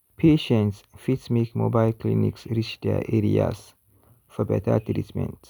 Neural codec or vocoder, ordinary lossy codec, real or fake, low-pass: none; none; real; none